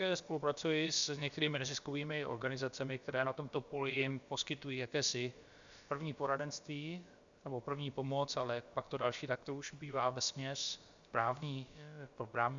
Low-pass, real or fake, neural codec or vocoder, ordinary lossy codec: 7.2 kHz; fake; codec, 16 kHz, about 1 kbps, DyCAST, with the encoder's durations; Opus, 64 kbps